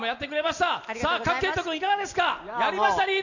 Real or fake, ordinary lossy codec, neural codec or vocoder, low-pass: real; none; none; 7.2 kHz